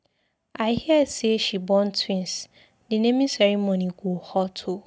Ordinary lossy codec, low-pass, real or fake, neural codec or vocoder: none; none; real; none